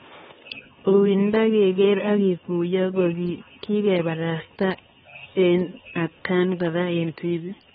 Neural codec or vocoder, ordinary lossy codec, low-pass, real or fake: codec, 16 kHz, 4 kbps, X-Codec, HuBERT features, trained on LibriSpeech; AAC, 16 kbps; 7.2 kHz; fake